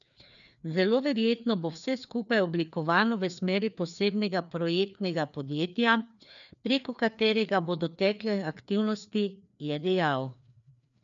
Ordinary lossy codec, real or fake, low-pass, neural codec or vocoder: none; fake; 7.2 kHz; codec, 16 kHz, 2 kbps, FreqCodec, larger model